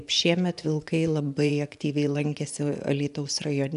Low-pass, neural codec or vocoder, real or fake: 10.8 kHz; vocoder, 24 kHz, 100 mel bands, Vocos; fake